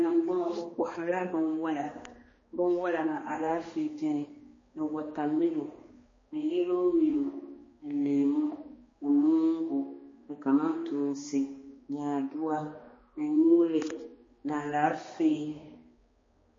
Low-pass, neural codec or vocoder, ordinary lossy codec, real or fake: 7.2 kHz; codec, 16 kHz, 2 kbps, X-Codec, HuBERT features, trained on balanced general audio; MP3, 32 kbps; fake